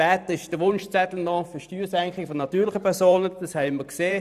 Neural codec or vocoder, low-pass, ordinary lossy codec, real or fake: vocoder, 44.1 kHz, 128 mel bands every 512 samples, BigVGAN v2; 14.4 kHz; none; fake